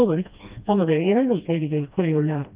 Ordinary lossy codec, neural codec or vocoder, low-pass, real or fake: Opus, 24 kbps; codec, 16 kHz, 1 kbps, FreqCodec, smaller model; 3.6 kHz; fake